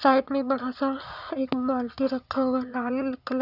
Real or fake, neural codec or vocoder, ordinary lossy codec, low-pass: fake; vocoder, 44.1 kHz, 80 mel bands, Vocos; none; 5.4 kHz